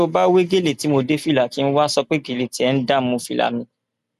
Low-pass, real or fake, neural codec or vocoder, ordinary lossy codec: 14.4 kHz; real; none; none